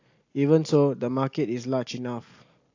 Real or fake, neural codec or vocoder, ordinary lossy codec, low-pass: real; none; AAC, 48 kbps; 7.2 kHz